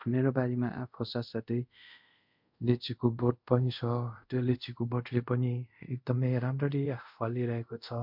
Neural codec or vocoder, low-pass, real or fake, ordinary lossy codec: codec, 24 kHz, 0.5 kbps, DualCodec; 5.4 kHz; fake; MP3, 48 kbps